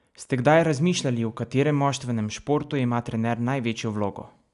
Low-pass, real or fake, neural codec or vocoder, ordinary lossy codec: 10.8 kHz; real; none; none